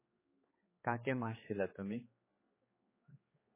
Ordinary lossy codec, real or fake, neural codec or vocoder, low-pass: MP3, 16 kbps; fake; codec, 16 kHz, 4 kbps, X-Codec, HuBERT features, trained on general audio; 3.6 kHz